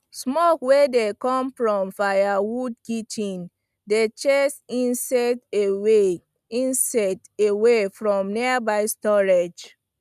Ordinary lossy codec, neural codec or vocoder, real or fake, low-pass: none; none; real; 14.4 kHz